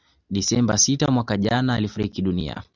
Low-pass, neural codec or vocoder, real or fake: 7.2 kHz; none; real